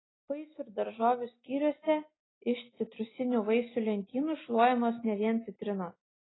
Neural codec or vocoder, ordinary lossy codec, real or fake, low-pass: none; AAC, 16 kbps; real; 7.2 kHz